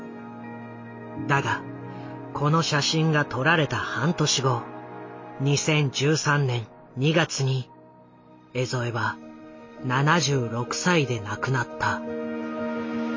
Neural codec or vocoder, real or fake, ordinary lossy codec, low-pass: none; real; MP3, 32 kbps; 7.2 kHz